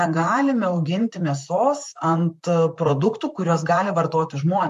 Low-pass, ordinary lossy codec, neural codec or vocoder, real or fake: 14.4 kHz; MP3, 64 kbps; vocoder, 44.1 kHz, 128 mel bands, Pupu-Vocoder; fake